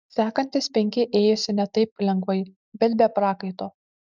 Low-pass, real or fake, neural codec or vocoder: 7.2 kHz; real; none